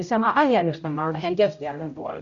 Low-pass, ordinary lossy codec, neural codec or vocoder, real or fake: 7.2 kHz; none; codec, 16 kHz, 0.5 kbps, X-Codec, HuBERT features, trained on general audio; fake